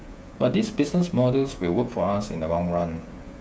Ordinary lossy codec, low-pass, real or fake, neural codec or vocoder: none; none; fake; codec, 16 kHz, 16 kbps, FreqCodec, smaller model